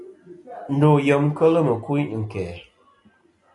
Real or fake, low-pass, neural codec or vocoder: real; 10.8 kHz; none